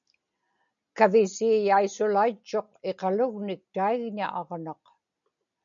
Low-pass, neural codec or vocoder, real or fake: 7.2 kHz; none; real